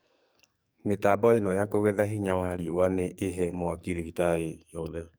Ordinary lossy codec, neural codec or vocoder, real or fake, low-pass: none; codec, 44.1 kHz, 2.6 kbps, SNAC; fake; none